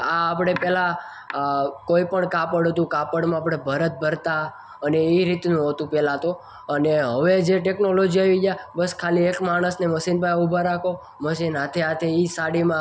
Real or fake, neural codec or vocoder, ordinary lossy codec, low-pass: real; none; none; none